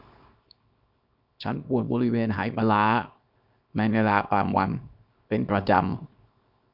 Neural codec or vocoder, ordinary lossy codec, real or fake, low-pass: codec, 24 kHz, 0.9 kbps, WavTokenizer, small release; none; fake; 5.4 kHz